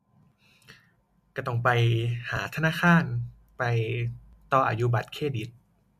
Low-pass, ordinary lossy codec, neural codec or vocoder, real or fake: 14.4 kHz; MP3, 96 kbps; none; real